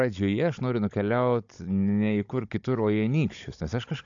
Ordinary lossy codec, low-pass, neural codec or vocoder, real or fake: AAC, 64 kbps; 7.2 kHz; codec, 16 kHz, 16 kbps, FunCodec, trained on Chinese and English, 50 frames a second; fake